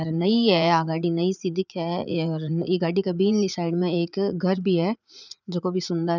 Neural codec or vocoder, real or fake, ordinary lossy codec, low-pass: vocoder, 44.1 kHz, 80 mel bands, Vocos; fake; none; 7.2 kHz